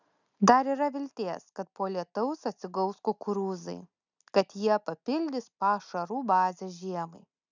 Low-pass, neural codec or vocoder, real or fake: 7.2 kHz; none; real